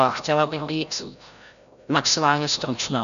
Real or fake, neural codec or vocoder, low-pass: fake; codec, 16 kHz, 0.5 kbps, FreqCodec, larger model; 7.2 kHz